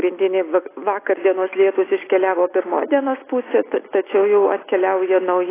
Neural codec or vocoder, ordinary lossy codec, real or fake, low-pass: none; AAC, 16 kbps; real; 3.6 kHz